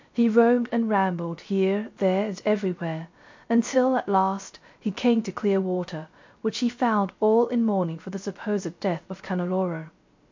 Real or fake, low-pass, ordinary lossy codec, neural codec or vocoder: fake; 7.2 kHz; MP3, 48 kbps; codec, 16 kHz, 0.3 kbps, FocalCodec